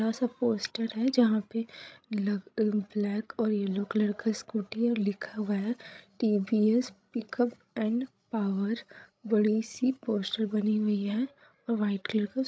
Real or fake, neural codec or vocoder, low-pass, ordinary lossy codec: fake; codec, 16 kHz, 16 kbps, FreqCodec, larger model; none; none